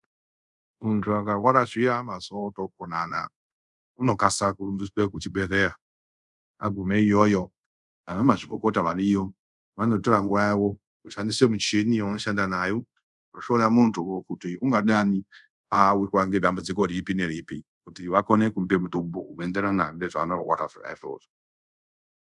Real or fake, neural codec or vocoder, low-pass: fake; codec, 24 kHz, 0.5 kbps, DualCodec; 10.8 kHz